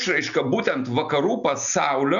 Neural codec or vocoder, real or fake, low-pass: none; real; 7.2 kHz